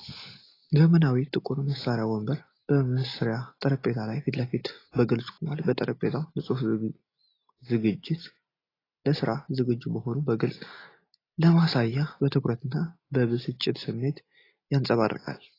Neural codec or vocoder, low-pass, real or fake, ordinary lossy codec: none; 5.4 kHz; real; AAC, 24 kbps